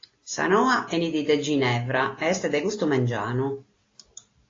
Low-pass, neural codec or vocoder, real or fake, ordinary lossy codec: 7.2 kHz; none; real; AAC, 32 kbps